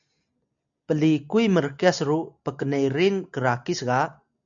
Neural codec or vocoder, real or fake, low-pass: none; real; 7.2 kHz